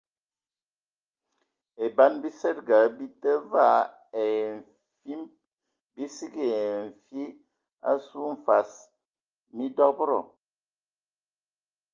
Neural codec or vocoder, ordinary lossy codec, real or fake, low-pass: none; Opus, 24 kbps; real; 7.2 kHz